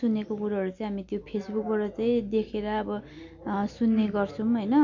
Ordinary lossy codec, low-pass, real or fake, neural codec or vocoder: none; 7.2 kHz; real; none